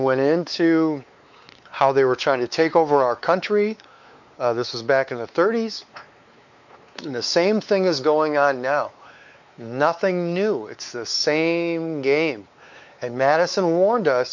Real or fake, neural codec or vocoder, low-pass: fake; codec, 16 kHz, 4 kbps, X-Codec, WavLM features, trained on Multilingual LibriSpeech; 7.2 kHz